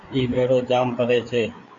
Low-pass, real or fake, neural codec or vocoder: 7.2 kHz; fake; codec, 16 kHz, 4 kbps, FreqCodec, larger model